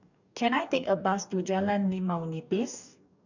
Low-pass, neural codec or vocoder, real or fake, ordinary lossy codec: 7.2 kHz; codec, 44.1 kHz, 2.6 kbps, DAC; fake; none